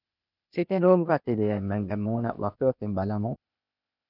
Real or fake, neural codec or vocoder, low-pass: fake; codec, 16 kHz, 0.8 kbps, ZipCodec; 5.4 kHz